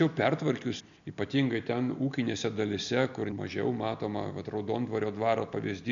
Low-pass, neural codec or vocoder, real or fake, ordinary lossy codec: 7.2 kHz; none; real; MP3, 96 kbps